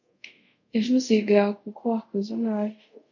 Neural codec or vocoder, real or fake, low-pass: codec, 24 kHz, 0.5 kbps, DualCodec; fake; 7.2 kHz